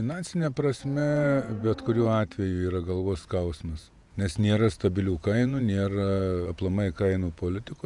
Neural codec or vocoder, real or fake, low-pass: vocoder, 48 kHz, 128 mel bands, Vocos; fake; 10.8 kHz